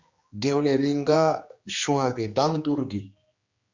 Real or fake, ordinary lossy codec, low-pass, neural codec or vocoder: fake; Opus, 64 kbps; 7.2 kHz; codec, 16 kHz, 2 kbps, X-Codec, HuBERT features, trained on general audio